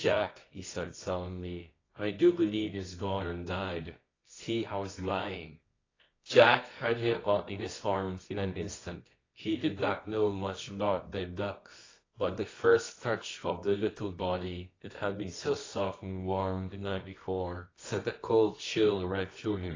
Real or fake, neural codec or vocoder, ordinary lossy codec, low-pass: fake; codec, 24 kHz, 0.9 kbps, WavTokenizer, medium music audio release; AAC, 32 kbps; 7.2 kHz